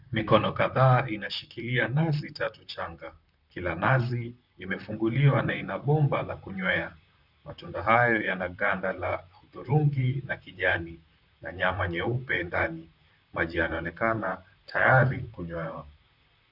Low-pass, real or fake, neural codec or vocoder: 5.4 kHz; fake; vocoder, 44.1 kHz, 128 mel bands, Pupu-Vocoder